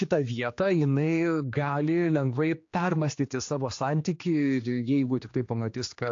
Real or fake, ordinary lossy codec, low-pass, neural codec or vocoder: fake; AAC, 48 kbps; 7.2 kHz; codec, 16 kHz, 2 kbps, X-Codec, HuBERT features, trained on general audio